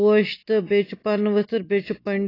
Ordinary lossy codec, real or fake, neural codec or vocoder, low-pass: AAC, 24 kbps; real; none; 5.4 kHz